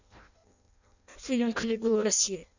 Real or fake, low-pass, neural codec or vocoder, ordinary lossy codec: fake; 7.2 kHz; codec, 16 kHz in and 24 kHz out, 0.6 kbps, FireRedTTS-2 codec; none